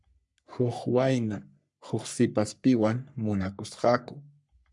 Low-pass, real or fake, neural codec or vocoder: 10.8 kHz; fake; codec, 44.1 kHz, 3.4 kbps, Pupu-Codec